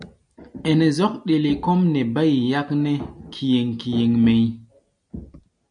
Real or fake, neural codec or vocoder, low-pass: real; none; 9.9 kHz